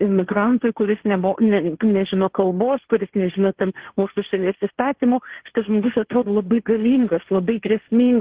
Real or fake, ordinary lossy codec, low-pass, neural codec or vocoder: fake; Opus, 16 kbps; 3.6 kHz; codec, 16 kHz, 1.1 kbps, Voila-Tokenizer